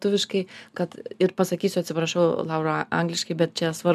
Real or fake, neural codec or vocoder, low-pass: real; none; 14.4 kHz